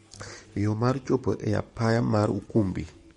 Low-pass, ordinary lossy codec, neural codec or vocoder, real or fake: 19.8 kHz; MP3, 48 kbps; codec, 44.1 kHz, 7.8 kbps, DAC; fake